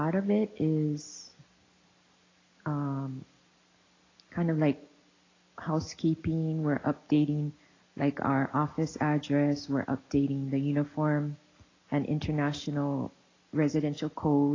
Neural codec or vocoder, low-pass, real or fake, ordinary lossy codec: none; 7.2 kHz; real; AAC, 32 kbps